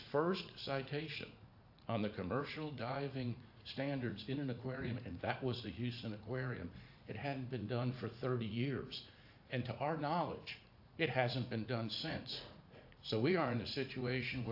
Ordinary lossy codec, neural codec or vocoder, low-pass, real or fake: AAC, 48 kbps; vocoder, 44.1 kHz, 80 mel bands, Vocos; 5.4 kHz; fake